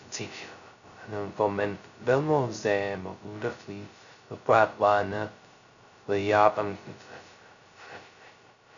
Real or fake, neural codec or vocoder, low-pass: fake; codec, 16 kHz, 0.2 kbps, FocalCodec; 7.2 kHz